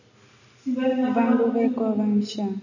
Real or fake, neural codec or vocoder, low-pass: real; none; 7.2 kHz